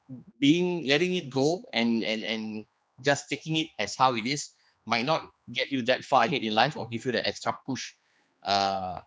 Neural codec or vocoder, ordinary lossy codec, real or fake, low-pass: codec, 16 kHz, 2 kbps, X-Codec, HuBERT features, trained on general audio; none; fake; none